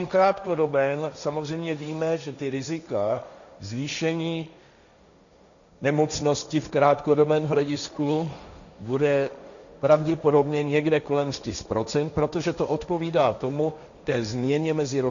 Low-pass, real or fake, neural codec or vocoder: 7.2 kHz; fake; codec, 16 kHz, 1.1 kbps, Voila-Tokenizer